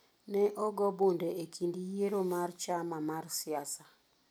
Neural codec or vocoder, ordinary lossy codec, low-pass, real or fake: none; none; none; real